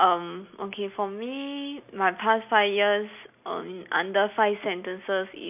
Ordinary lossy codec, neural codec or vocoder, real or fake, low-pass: none; none; real; 3.6 kHz